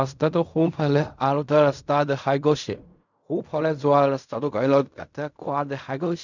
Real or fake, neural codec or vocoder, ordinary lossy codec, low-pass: fake; codec, 16 kHz in and 24 kHz out, 0.4 kbps, LongCat-Audio-Codec, fine tuned four codebook decoder; none; 7.2 kHz